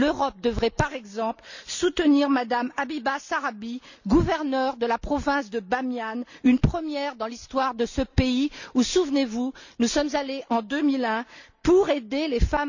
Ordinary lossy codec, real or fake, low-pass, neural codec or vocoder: none; real; 7.2 kHz; none